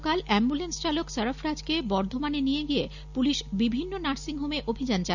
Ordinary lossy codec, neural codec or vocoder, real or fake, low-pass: none; none; real; 7.2 kHz